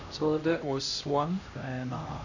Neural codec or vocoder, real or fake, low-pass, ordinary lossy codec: codec, 16 kHz, 1 kbps, X-Codec, HuBERT features, trained on LibriSpeech; fake; 7.2 kHz; none